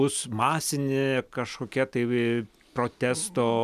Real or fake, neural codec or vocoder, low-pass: real; none; 14.4 kHz